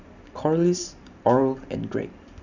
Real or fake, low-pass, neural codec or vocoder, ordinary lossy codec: fake; 7.2 kHz; vocoder, 44.1 kHz, 128 mel bands every 512 samples, BigVGAN v2; none